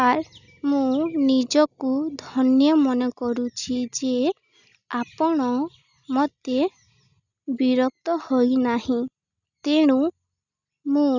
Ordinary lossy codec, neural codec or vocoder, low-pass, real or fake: none; none; 7.2 kHz; real